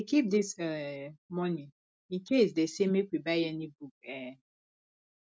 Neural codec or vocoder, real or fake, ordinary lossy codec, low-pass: none; real; none; none